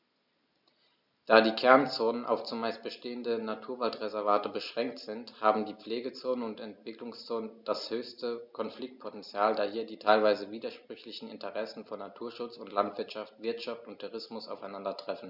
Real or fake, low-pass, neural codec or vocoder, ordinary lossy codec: real; 5.4 kHz; none; none